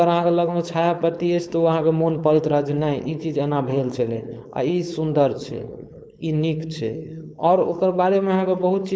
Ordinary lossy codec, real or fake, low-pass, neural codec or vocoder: none; fake; none; codec, 16 kHz, 4.8 kbps, FACodec